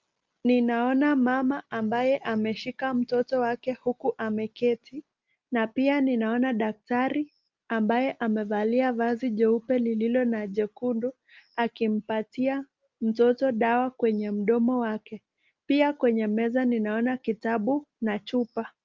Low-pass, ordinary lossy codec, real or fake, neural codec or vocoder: 7.2 kHz; Opus, 32 kbps; real; none